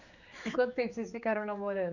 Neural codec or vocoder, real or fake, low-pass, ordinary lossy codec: codec, 16 kHz, 4 kbps, X-Codec, HuBERT features, trained on general audio; fake; 7.2 kHz; none